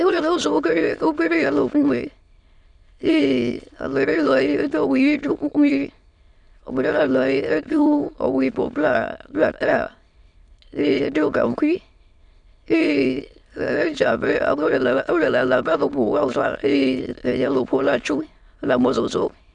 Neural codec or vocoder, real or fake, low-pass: autoencoder, 22.05 kHz, a latent of 192 numbers a frame, VITS, trained on many speakers; fake; 9.9 kHz